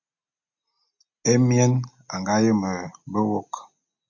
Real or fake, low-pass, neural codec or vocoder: real; 7.2 kHz; none